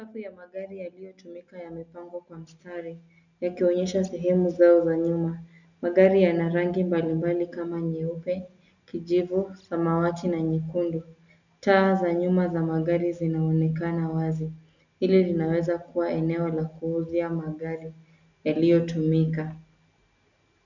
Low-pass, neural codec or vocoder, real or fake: 7.2 kHz; none; real